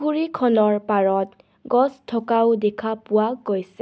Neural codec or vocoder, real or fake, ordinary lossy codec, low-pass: none; real; none; none